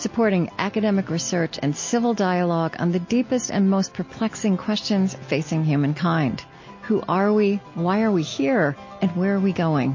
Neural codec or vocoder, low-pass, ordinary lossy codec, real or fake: none; 7.2 kHz; MP3, 32 kbps; real